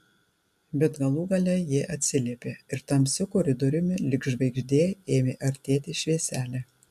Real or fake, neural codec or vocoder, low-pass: real; none; 14.4 kHz